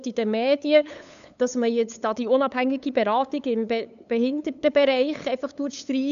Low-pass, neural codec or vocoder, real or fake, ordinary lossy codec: 7.2 kHz; codec, 16 kHz, 8 kbps, FunCodec, trained on LibriTTS, 25 frames a second; fake; none